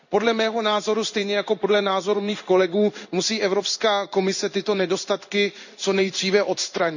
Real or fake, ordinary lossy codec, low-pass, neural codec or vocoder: fake; none; 7.2 kHz; codec, 16 kHz in and 24 kHz out, 1 kbps, XY-Tokenizer